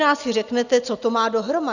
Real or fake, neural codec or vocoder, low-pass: real; none; 7.2 kHz